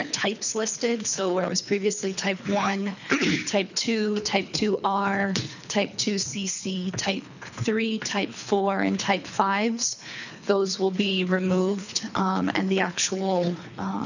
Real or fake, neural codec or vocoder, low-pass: fake; codec, 24 kHz, 3 kbps, HILCodec; 7.2 kHz